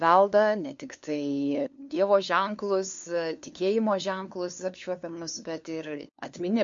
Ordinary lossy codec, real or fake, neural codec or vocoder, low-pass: MP3, 48 kbps; fake; codec, 16 kHz, 2 kbps, FunCodec, trained on LibriTTS, 25 frames a second; 7.2 kHz